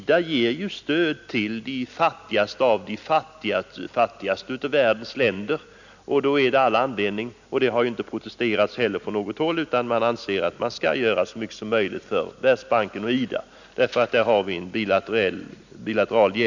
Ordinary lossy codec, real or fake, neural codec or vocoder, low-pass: none; real; none; 7.2 kHz